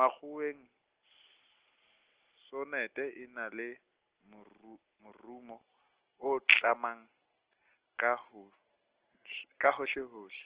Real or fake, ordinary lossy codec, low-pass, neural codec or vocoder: real; Opus, 16 kbps; 3.6 kHz; none